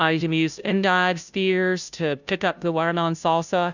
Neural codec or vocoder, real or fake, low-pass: codec, 16 kHz, 0.5 kbps, FunCodec, trained on Chinese and English, 25 frames a second; fake; 7.2 kHz